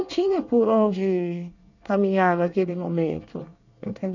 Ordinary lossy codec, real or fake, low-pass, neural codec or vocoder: none; fake; 7.2 kHz; codec, 24 kHz, 1 kbps, SNAC